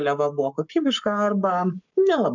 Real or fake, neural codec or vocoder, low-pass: fake; codec, 44.1 kHz, 7.8 kbps, Pupu-Codec; 7.2 kHz